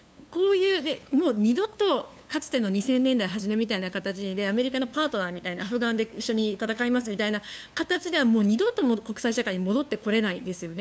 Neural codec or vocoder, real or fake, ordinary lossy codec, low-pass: codec, 16 kHz, 2 kbps, FunCodec, trained on LibriTTS, 25 frames a second; fake; none; none